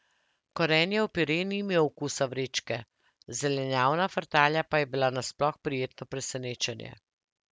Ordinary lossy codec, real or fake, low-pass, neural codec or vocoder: none; real; none; none